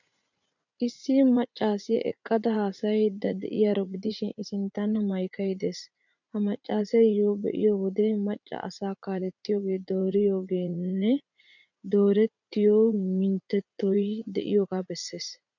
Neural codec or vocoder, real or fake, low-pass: vocoder, 44.1 kHz, 80 mel bands, Vocos; fake; 7.2 kHz